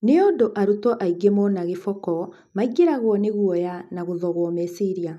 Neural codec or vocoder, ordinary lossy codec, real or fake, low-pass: none; none; real; 14.4 kHz